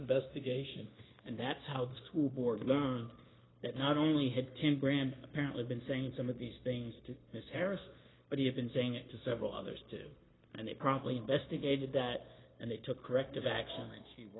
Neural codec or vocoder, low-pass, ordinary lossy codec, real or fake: codec, 16 kHz, 0.9 kbps, LongCat-Audio-Codec; 7.2 kHz; AAC, 16 kbps; fake